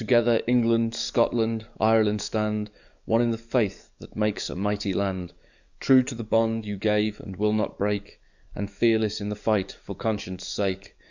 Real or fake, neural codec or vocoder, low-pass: fake; codec, 44.1 kHz, 7.8 kbps, DAC; 7.2 kHz